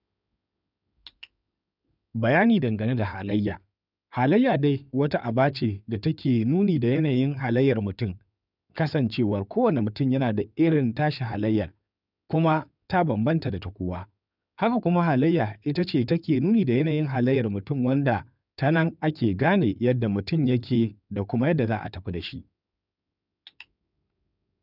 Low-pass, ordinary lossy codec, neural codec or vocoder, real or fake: 5.4 kHz; none; codec, 16 kHz in and 24 kHz out, 2.2 kbps, FireRedTTS-2 codec; fake